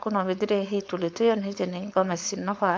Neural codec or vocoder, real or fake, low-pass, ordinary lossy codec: codec, 16 kHz, 4.8 kbps, FACodec; fake; none; none